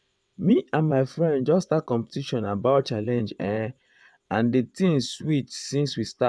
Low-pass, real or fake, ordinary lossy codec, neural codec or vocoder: none; fake; none; vocoder, 22.05 kHz, 80 mel bands, WaveNeXt